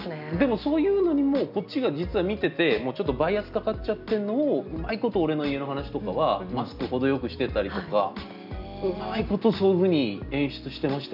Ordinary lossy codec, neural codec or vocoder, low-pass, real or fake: none; none; 5.4 kHz; real